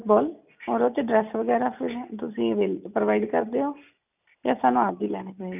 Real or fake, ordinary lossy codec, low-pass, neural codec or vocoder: real; none; 3.6 kHz; none